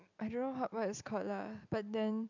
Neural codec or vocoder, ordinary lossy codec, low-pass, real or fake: none; none; 7.2 kHz; real